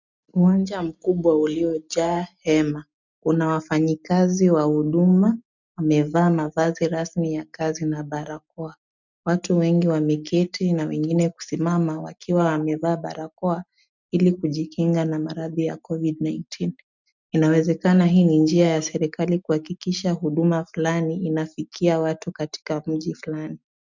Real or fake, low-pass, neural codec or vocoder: real; 7.2 kHz; none